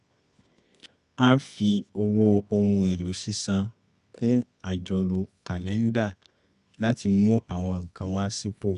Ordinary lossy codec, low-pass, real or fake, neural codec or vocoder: MP3, 96 kbps; 10.8 kHz; fake; codec, 24 kHz, 0.9 kbps, WavTokenizer, medium music audio release